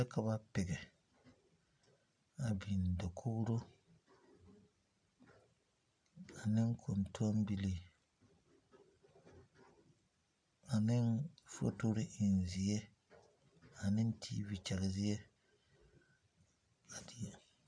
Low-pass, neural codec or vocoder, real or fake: 9.9 kHz; none; real